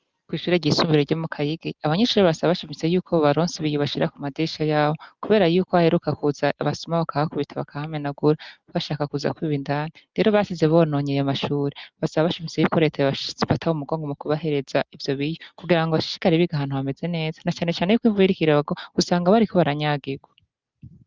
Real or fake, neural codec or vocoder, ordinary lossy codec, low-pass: real; none; Opus, 24 kbps; 7.2 kHz